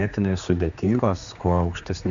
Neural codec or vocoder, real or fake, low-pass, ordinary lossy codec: codec, 16 kHz, 2 kbps, X-Codec, HuBERT features, trained on general audio; fake; 7.2 kHz; MP3, 64 kbps